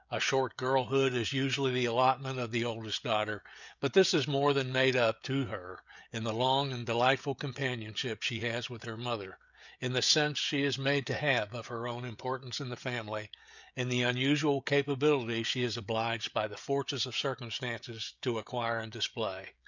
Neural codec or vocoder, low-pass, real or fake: codec, 16 kHz, 16 kbps, FreqCodec, smaller model; 7.2 kHz; fake